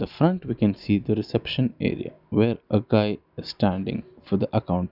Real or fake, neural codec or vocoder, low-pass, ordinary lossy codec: real; none; 5.4 kHz; none